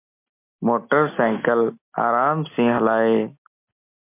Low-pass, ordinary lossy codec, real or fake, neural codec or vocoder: 3.6 kHz; MP3, 32 kbps; real; none